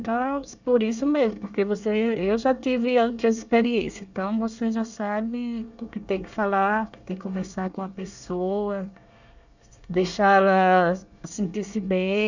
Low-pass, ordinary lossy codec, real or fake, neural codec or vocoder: 7.2 kHz; none; fake; codec, 24 kHz, 1 kbps, SNAC